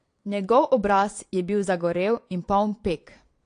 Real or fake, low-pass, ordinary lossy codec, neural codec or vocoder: fake; 9.9 kHz; AAC, 48 kbps; vocoder, 22.05 kHz, 80 mel bands, Vocos